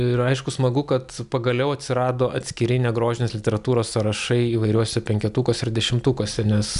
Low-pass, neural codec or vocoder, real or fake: 10.8 kHz; none; real